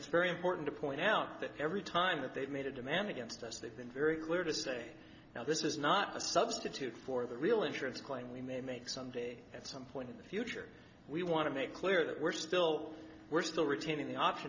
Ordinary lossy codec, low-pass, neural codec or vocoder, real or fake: MP3, 64 kbps; 7.2 kHz; none; real